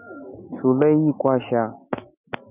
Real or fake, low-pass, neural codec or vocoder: real; 3.6 kHz; none